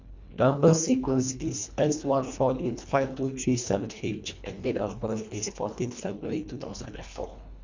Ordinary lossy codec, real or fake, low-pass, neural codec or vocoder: MP3, 64 kbps; fake; 7.2 kHz; codec, 24 kHz, 1.5 kbps, HILCodec